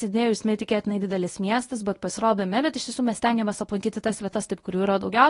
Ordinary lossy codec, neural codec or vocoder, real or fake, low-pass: AAC, 32 kbps; codec, 24 kHz, 0.9 kbps, WavTokenizer, medium speech release version 1; fake; 10.8 kHz